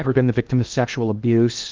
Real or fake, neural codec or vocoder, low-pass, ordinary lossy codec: fake; codec, 16 kHz in and 24 kHz out, 0.8 kbps, FocalCodec, streaming, 65536 codes; 7.2 kHz; Opus, 24 kbps